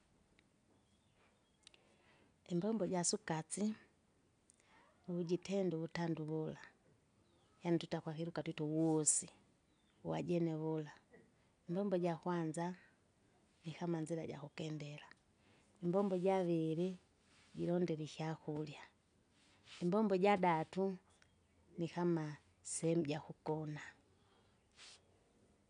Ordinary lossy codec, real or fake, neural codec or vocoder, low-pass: none; real; none; 9.9 kHz